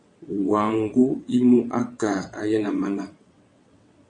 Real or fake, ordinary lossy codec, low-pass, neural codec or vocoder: fake; MP3, 48 kbps; 9.9 kHz; vocoder, 22.05 kHz, 80 mel bands, WaveNeXt